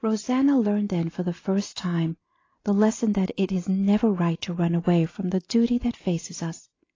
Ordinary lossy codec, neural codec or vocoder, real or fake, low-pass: AAC, 32 kbps; none; real; 7.2 kHz